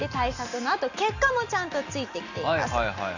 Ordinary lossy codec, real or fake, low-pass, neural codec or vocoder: none; real; 7.2 kHz; none